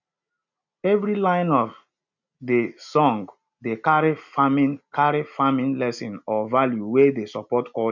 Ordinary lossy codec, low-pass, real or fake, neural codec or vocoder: none; 7.2 kHz; real; none